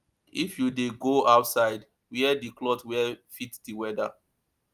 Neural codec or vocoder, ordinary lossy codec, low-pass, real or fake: none; Opus, 32 kbps; 14.4 kHz; real